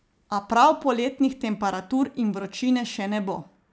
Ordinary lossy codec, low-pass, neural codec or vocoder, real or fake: none; none; none; real